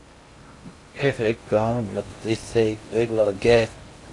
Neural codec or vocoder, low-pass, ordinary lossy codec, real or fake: codec, 16 kHz in and 24 kHz out, 0.6 kbps, FocalCodec, streaming, 2048 codes; 10.8 kHz; AAC, 48 kbps; fake